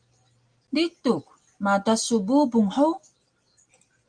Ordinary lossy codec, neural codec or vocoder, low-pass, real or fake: Opus, 32 kbps; none; 9.9 kHz; real